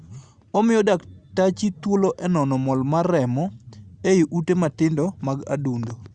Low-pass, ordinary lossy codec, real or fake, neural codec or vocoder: 10.8 kHz; Opus, 64 kbps; real; none